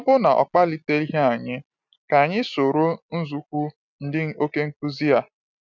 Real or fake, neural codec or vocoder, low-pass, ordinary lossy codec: real; none; 7.2 kHz; none